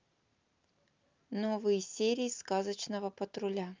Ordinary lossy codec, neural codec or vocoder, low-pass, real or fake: Opus, 24 kbps; none; 7.2 kHz; real